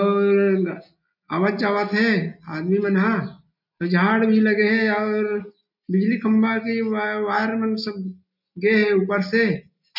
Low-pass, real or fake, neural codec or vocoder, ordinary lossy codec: 5.4 kHz; real; none; none